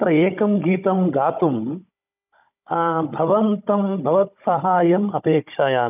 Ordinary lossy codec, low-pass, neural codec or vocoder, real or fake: none; 3.6 kHz; codec, 16 kHz, 16 kbps, FunCodec, trained on Chinese and English, 50 frames a second; fake